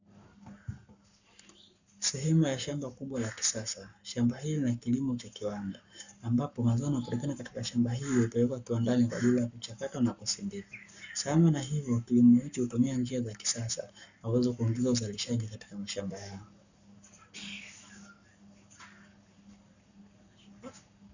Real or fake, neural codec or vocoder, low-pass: fake; codec, 44.1 kHz, 7.8 kbps, Pupu-Codec; 7.2 kHz